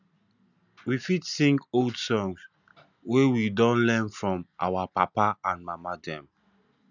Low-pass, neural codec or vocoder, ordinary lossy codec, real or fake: 7.2 kHz; none; none; real